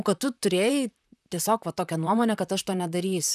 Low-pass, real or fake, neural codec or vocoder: 14.4 kHz; fake; vocoder, 44.1 kHz, 128 mel bands, Pupu-Vocoder